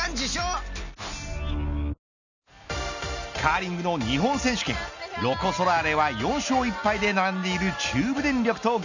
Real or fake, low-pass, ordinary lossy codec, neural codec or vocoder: real; 7.2 kHz; none; none